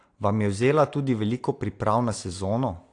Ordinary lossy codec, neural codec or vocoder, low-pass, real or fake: AAC, 48 kbps; none; 9.9 kHz; real